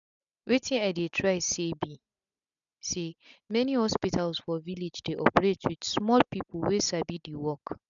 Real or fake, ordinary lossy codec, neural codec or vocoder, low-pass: real; none; none; 7.2 kHz